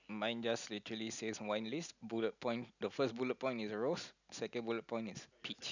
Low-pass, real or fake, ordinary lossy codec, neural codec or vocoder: 7.2 kHz; real; none; none